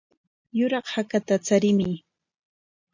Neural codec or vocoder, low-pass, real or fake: none; 7.2 kHz; real